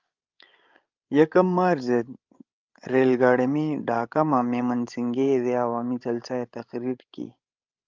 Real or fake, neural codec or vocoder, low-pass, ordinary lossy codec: fake; codec, 16 kHz, 16 kbps, FreqCodec, larger model; 7.2 kHz; Opus, 24 kbps